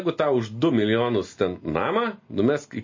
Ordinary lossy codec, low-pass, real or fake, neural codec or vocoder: MP3, 32 kbps; 7.2 kHz; real; none